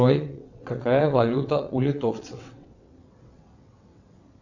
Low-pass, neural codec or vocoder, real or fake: 7.2 kHz; vocoder, 22.05 kHz, 80 mel bands, WaveNeXt; fake